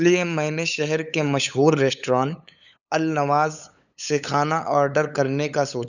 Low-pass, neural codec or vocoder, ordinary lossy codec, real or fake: 7.2 kHz; codec, 16 kHz, 8 kbps, FunCodec, trained on LibriTTS, 25 frames a second; none; fake